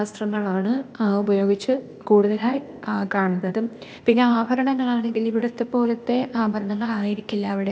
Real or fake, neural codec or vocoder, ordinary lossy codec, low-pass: fake; codec, 16 kHz, 0.8 kbps, ZipCodec; none; none